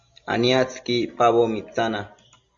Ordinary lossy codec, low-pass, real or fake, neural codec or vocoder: Opus, 64 kbps; 7.2 kHz; real; none